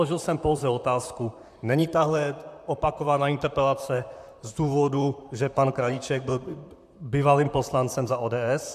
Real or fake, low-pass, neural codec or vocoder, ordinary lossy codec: fake; 14.4 kHz; vocoder, 44.1 kHz, 128 mel bands, Pupu-Vocoder; MP3, 96 kbps